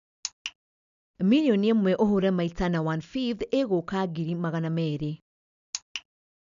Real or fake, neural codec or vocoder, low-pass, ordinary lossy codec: real; none; 7.2 kHz; none